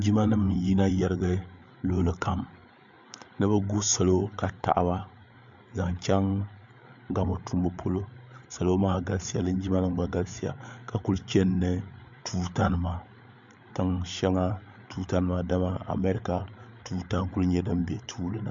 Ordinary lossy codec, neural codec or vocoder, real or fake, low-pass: MP3, 64 kbps; codec, 16 kHz, 8 kbps, FreqCodec, larger model; fake; 7.2 kHz